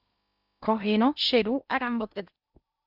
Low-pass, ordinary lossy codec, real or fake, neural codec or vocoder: 5.4 kHz; Opus, 64 kbps; fake; codec, 16 kHz in and 24 kHz out, 0.6 kbps, FocalCodec, streaming, 2048 codes